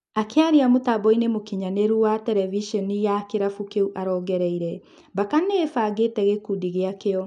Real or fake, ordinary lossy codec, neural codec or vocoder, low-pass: real; none; none; 10.8 kHz